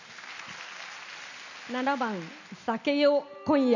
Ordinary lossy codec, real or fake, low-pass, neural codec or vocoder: none; real; 7.2 kHz; none